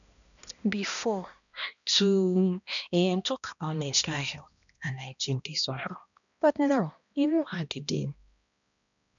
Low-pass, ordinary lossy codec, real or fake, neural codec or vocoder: 7.2 kHz; none; fake; codec, 16 kHz, 1 kbps, X-Codec, HuBERT features, trained on balanced general audio